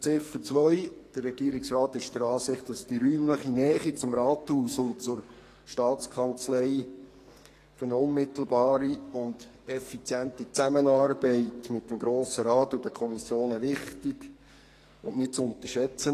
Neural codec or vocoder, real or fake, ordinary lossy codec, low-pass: codec, 44.1 kHz, 2.6 kbps, SNAC; fake; AAC, 48 kbps; 14.4 kHz